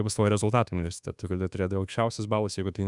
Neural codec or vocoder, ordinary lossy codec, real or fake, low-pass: codec, 24 kHz, 1.2 kbps, DualCodec; Opus, 64 kbps; fake; 10.8 kHz